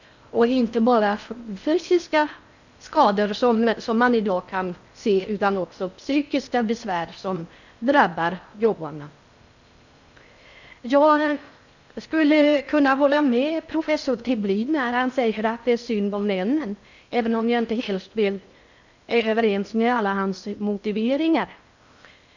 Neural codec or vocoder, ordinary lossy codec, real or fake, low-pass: codec, 16 kHz in and 24 kHz out, 0.6 kbps, FocalCodec, streaming, 4096 codes; none; fake; 7.2 kHz